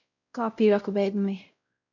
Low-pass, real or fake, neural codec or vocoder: 7.2 kHz; fake; codec, 16 kHz, 0.5 kbps, X-Codec, WavLM features, trained on Multilingual LibriSpeech